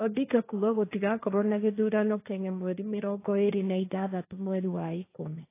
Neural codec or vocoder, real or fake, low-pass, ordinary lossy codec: codec, 16 kHz, 1.1 kbps, Voila-Tokenizer; fake; 3.6 kHz; AAC, 24 kbps